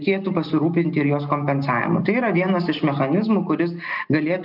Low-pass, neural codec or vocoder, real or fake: 5.4 kHz; none; real